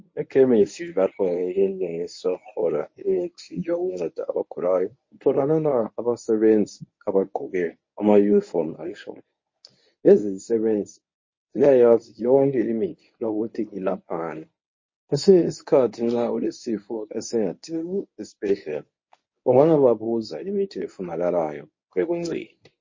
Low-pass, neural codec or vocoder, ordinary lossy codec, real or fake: 7.2 kHz; codec, 24 kHz, 0.9 kbps, WavTokenizer, medium speech release version 1; MP3, 32 kbps; fake